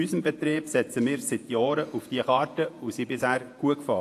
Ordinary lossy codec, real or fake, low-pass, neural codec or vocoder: AAC, 64 kbps; fake; 14.4 kHz; vocoder, 48 kHz, 128 mel bands, Vocos